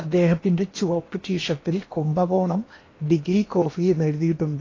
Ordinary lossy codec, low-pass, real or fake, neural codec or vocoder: AAC, 32 kbps; 7.2 kHz; fake; codec, 16 kHz in and 24 kHz out, 0.8 kbps, FocalCodec, streaming, 65536 codes